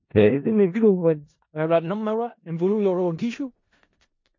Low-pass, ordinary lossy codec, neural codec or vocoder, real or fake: 7.2 kHz; MP3, 32 kbps; codec, 16 kHz in and 24 kHz out, 0.4 kbps, LongCat-Audio-Codec, four codebook decoder; fake